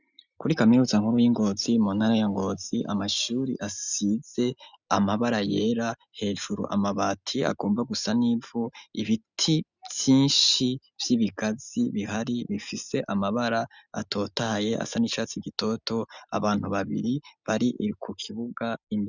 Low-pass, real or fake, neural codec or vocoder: 7.2 kHz; real; none